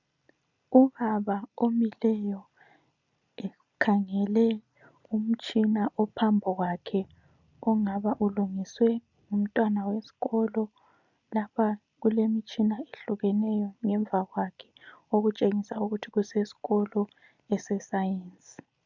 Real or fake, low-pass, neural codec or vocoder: real; 7.2 kHz; none